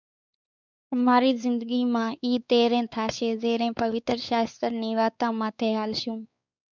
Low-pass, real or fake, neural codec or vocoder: 7.2 kHz; fake; codec, 16 kHz, 4 kbps, X-Codec, WavLM features, trained on Multilingual LibriSpeech